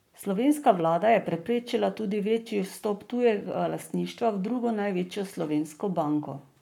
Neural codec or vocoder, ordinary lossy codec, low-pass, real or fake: codec, 44.1 kHz, 7.8 kbps, Pupu-Codec; none; 19.8 kHz; fake